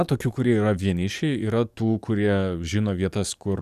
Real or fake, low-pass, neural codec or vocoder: fake; 14.4 kHz; codec, 44.1 kHz, 7.8 kbps, DAC